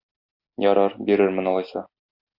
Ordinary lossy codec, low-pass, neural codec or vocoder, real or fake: Opus, 64 kbps; 5.4 kHz; none; real